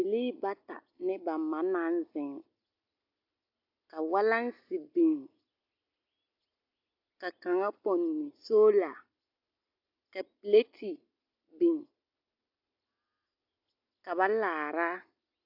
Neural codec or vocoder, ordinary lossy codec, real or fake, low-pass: none; AAC, 48 kbps; real; 5.4 kHz